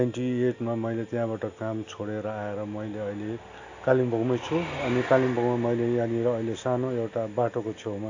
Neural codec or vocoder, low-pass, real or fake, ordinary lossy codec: none; 7.2 kHz; real; AAC, 48 kbps